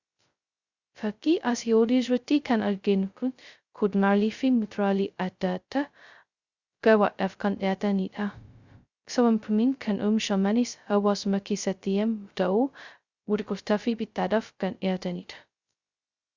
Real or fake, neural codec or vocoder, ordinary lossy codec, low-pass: fake; codec, 16 kHz, 0.2 kbps, FocalCodec; Opus, 64 kbps; 7.2 kHz